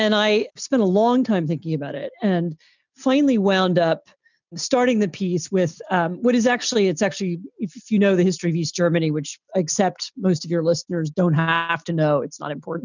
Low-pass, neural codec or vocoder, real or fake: 7.2 kHz; none; real